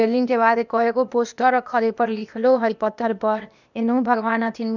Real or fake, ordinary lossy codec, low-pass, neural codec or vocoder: fake; none; 7.2 kHz; codec, 16 kHz, 0.8 kbps, ZipCodec